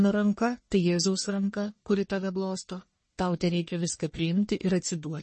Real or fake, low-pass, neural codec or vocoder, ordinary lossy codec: fake; 10.8 kHz; codec, 44.1 kHz, 1.7 kbps, Pupu-Codec; MP3, 32 kbps